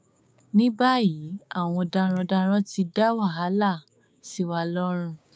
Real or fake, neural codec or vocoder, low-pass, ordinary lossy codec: fake; codec, 16 kHz, 6 kbps, DAC; none; none